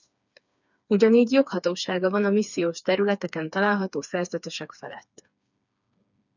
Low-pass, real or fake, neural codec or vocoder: 7.2 kHz; fake; codec, 16 kHz, 4 kbps, FreqCodec, smaller model